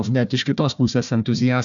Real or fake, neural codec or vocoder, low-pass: fake; codec, 16 kHz, 1 kbps, FunCodec, trained on Chinese and English, 50 frames a second; 7.2 kHz